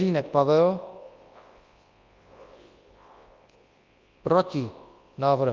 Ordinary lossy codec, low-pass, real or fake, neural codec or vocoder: Opus, 32 kbps; 7.2 kHz; fake; codec, 24 kHz, 0.9 kbps, WavTokenizer, large speech release